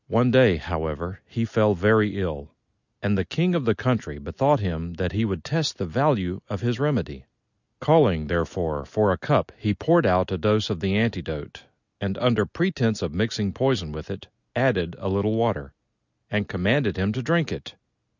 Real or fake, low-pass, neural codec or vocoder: real; 7.2 kHz; none